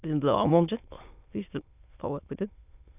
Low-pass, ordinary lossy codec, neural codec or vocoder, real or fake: 3.6 kHz; none; autoencoder, 22.05 kHz, a latent of 192 numbers a frame, VITS, trained on many speakers; fake